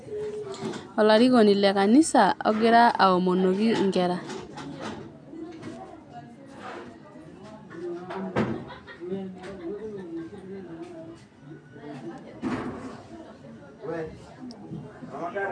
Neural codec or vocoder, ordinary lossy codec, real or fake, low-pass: none; none; real; 9.9 kHz